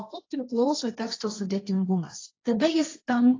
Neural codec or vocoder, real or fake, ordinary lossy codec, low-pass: codec, 16 kHz, 1.1 kbps, Voila-Tokenizer; fake; AAC, 32 kbps; 7.2 kHz